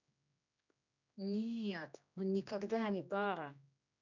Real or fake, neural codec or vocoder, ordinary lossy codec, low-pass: fake; codec, 16 kHz, 1 kbps, X-Codec, HuBERT features, trained on general audio; none; 7.2 kHz